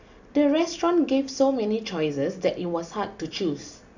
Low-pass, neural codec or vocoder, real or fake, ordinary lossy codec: 7.2 kHz; none; real; none